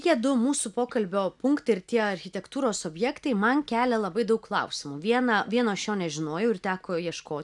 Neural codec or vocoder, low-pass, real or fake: none; 10.8 kHz; real